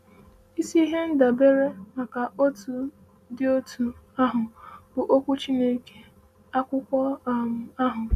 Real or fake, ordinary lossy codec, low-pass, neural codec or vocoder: real; none; 14.4 kHz; none